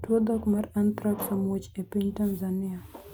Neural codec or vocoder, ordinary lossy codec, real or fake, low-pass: none; none; real; none